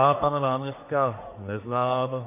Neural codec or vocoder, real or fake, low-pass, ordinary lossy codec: codec, 44.1 kHz, 1.7 kbps, Pupu-Codec; fake; 3.6 kHz; MP3, 24 kbps